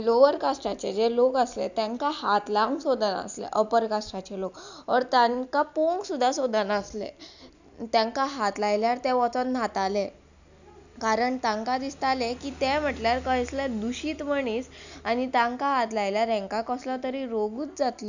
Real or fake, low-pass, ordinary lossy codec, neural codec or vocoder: real; 7.2 kHz; none; none